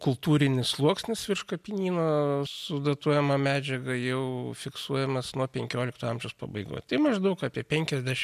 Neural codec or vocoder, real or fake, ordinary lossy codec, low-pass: none; real; MP3, 96 kbps; 14.4 kHz